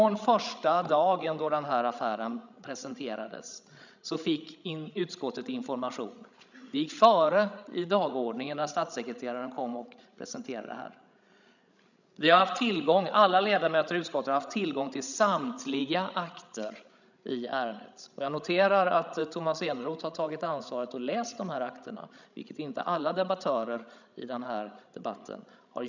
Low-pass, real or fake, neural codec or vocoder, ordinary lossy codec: 7.2 kHz; fake; codec, 16 kHz, 16 kbps, FreqCodec, larger model; none